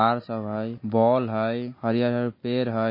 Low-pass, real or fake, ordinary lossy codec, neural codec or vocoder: 5.4 kHz; real; MP3, 24 kbps; none